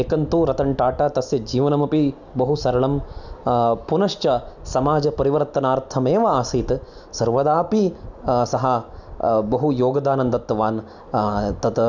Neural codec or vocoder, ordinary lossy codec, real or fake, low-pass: none; none; real; 7.2 kHz